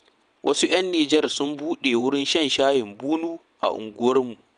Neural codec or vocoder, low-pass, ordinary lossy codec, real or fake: vocoder, 22.05 kHz, 80 mel bands, WaveNeXt; 9.9 kHz; none; fake